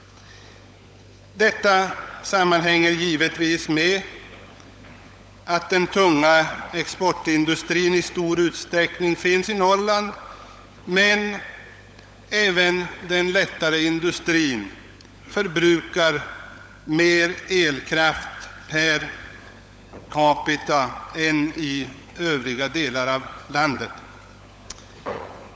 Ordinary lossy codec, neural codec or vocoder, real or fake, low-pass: none; codec, 16 kHz, 16 kbps, FunCodec, trained on LibriTTS, 50 frames a second; fake; none